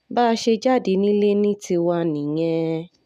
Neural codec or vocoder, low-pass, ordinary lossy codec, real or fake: none; 14.4 kHz; none; real